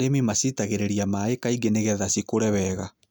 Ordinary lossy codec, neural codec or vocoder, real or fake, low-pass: none; none; real; none